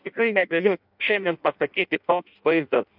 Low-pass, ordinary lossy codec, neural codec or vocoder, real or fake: 5.4 kHz; AAC, 48 kbps; codec, 16 kHz in and 24 kHz out, 0.6 kbps, FireRedTTS-2 codec; fake